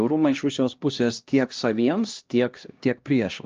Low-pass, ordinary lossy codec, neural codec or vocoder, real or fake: 7.2 kHz; Opus, 24 kbps; codec, 16 kHz, 1 kbps, X-Codec, HuBERT features, trained on LibriSpeech; fake